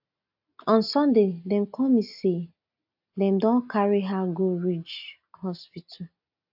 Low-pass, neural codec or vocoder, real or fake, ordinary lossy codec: 5.4 kHz; none; real; AAC, 48 kbps